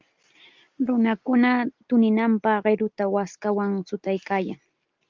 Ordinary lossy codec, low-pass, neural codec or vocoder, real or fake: Opus, 32 kbps; 7.2 kHz; none; real